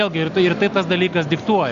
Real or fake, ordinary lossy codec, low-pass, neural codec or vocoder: real; Opus, 64 kbps; 7.2 kHz; none